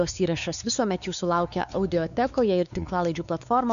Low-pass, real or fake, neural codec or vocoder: 7.2 kHz; fake; codec, 16 kHz, 4 kbps, X-Codec, WavLM features, trained on Multilingual LibriSpeech